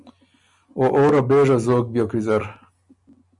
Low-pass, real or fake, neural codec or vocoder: 10.8 kHz; real; none